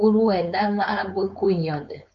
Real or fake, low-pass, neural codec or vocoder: fake; 7.2 kHz; codec, 16 kHz, 4.8 kbps, FACodec